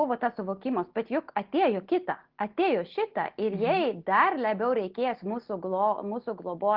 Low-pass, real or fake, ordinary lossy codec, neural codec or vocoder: 5.4 kHz; real; Opus, 16 kbps; none